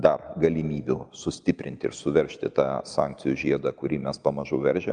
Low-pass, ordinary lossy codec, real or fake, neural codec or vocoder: 9.9 kHz; Opus, 32 kbps; real; none